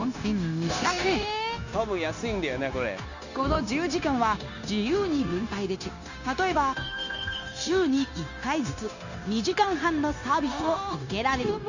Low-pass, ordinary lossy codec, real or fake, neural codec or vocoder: 7.2 kHz; none; fake; codec, 16 kHz, 0.9 kbps, LongCat-Audio-Codec